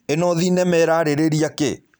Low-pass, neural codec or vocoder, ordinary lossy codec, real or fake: none; vocoder, 44.1 kHz, 128 mel bands every 256 samples, BigVGAN v2; none; fake